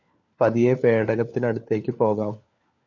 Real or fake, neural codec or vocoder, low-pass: fake; codec, 16 kHz, 4 kbps, FunCodec, trained on LibriTTS, 50 frames a second; 7.2 kHz